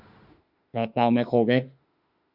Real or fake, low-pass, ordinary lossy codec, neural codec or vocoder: fake; 5.4 kHz; Opus, 64 kbps; autoencoder, 48 kHz, 32 numbers a frame, DAC-VAE, trained on Japanese speech